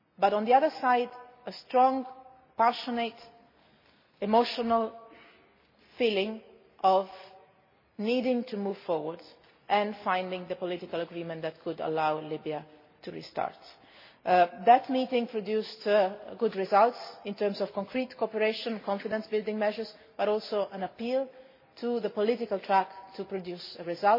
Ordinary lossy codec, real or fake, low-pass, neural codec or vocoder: MP3, 24 kbps; real; 5.4 kHz; none